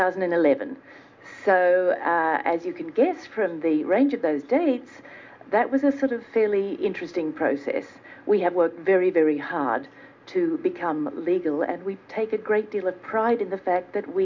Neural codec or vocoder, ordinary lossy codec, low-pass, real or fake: none; MP3, 64 kbps; 7.2 kHz; real